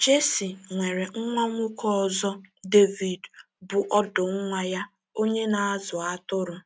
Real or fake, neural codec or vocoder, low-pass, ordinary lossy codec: real; none; none; none